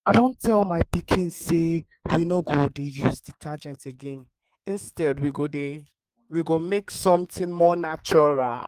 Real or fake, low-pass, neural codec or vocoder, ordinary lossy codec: fake; 14.4 kHz; codec, 32 kHz, 1.9 kbps, SNAC; Opus, 32 kbps